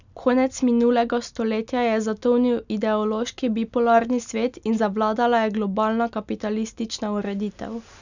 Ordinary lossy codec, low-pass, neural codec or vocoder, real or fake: none; 7.2 kHz; none; real